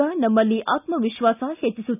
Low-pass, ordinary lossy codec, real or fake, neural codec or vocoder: 3.6 kHz; none; real; none